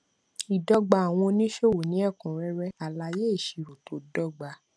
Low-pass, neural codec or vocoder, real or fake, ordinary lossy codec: none; none; real; none